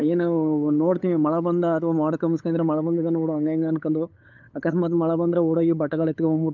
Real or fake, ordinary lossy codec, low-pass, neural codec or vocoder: fake; Opus, 24 kbps; 7.2 kHz; codec, 16 kHz, 8 kbps, FunCodec, trained on LibriTTS, 25 frames a second